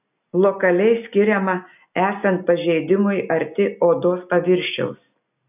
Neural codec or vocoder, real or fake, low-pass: none; real; 3.6 kHz